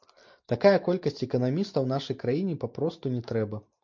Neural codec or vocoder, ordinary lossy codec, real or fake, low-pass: none; MP3, 48 kbps; real; 7.2 kHz